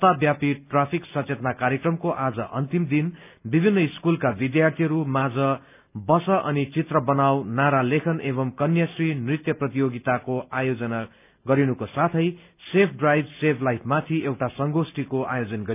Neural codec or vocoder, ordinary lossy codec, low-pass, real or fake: none; none; 3.6 kHz; real